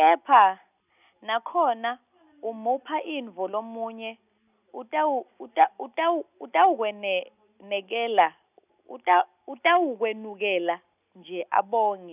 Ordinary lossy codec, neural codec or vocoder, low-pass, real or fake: none; none; 3.6 kHz; real